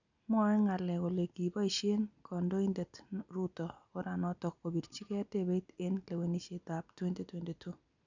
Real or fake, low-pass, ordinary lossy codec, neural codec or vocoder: real; 7.2 kHz; none; none